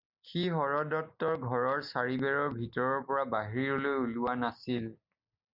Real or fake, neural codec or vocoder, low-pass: real; none; 5.4 kHz